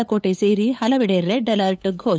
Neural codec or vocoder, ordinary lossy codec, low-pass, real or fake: codec, 16 kHz, 8 kbps, FunCodec, trained on LibriTTS, 25 frames a second; none; none; fake